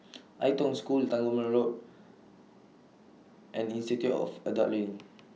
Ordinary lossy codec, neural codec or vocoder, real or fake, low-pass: none; none; real; none